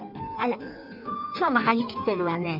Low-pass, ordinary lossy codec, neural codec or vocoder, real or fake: 5.4 kHz; none; codec, 16 kHz in and 24 kHz out, 1.1 kbps, FireRedTTS-2 codec; fake